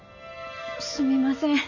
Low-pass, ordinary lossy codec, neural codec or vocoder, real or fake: 7.2 kHz; none; none; real